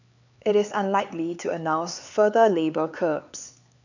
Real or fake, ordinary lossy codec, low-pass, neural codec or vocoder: fake; none; 7.2 kHz; codec, 16 kHz, 4 kbps, X-Codec, HuBERT features, trained on LibriSpeech